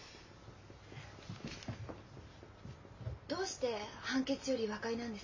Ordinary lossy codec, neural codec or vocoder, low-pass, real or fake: MP3, 32 kbps; none; 7.2 kHz; real